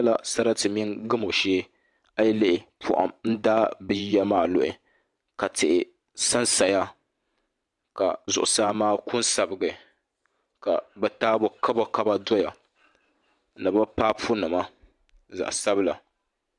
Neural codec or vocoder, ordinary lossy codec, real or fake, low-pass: vocoder, 44.1 kHz, 128 mel bands every 512 samples, BigVGAN v2; MP3, 96 kbps; fake; 10.8 kHz